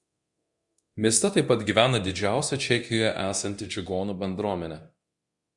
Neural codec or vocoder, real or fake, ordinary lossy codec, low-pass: codec, 24 kHz, 0.9 kbps, DualCodec; fake; Opus, 64 kbps; 10.8 kHz